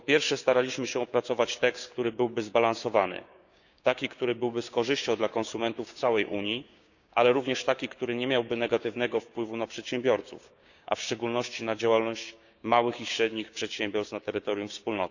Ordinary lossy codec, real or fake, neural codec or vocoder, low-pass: none; fake; codec, 16 kHz, 6 kbps, DAC; 7.2 kHz